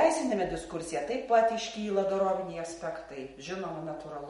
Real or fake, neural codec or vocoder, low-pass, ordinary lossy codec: real; none; 14.4 kHz; MP3, 48 kbps